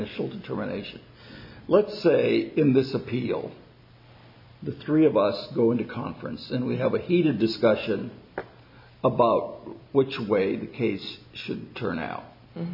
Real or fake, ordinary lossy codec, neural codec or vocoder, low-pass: real; MP3, 48 kbps; none; 5.4 kHz